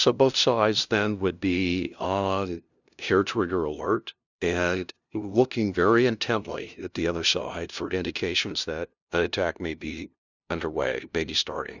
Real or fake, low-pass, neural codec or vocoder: fake; 7.2 kHz; codec, 16 kHz, 0.5 kbps, FunCodec, trained on LibriTTS, 25 frames a second